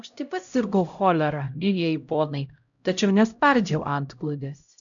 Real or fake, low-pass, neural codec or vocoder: fake; 7.2 kHz; codec, 16 kHz, 0.5 kbps, X-Codec, HuBERT features, trained on LibriSpeech